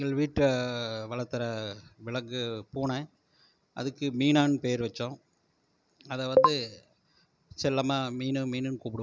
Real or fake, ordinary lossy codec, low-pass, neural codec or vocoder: real; none; none; none